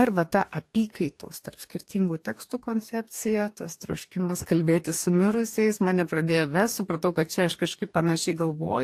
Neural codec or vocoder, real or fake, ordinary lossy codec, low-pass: codec, 44.1 kHz, 2.6 kbps, DAC; fake; AAC, 64 kbps; 14.4 kHz